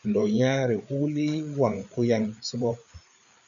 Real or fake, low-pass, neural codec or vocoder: fake; 7.2 kHz; codec, 16 kHz, 4 kbps, FreqCodec, larger model